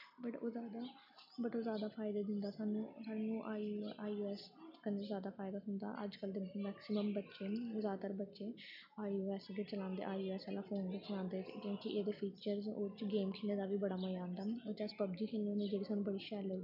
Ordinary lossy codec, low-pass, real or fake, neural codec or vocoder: none; 5.4 kHz; real; none